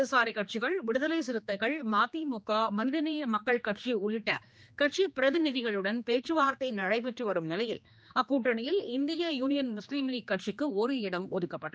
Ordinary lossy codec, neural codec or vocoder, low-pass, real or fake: none; codec, 16 kHz, 2 kbps, X-Codec, HuBERT features, trained on general audio; none; fake